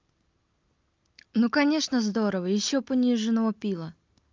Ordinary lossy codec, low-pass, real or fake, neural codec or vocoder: Opus, 24 kbps; 7.2 kHz; real; none